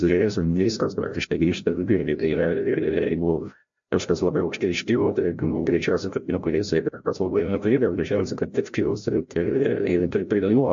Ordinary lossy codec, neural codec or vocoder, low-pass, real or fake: MP3, 48 kbps; codec, 16 kHz, 0.5 kbps, FreqCodec, larger model; 7.2 kHz; fake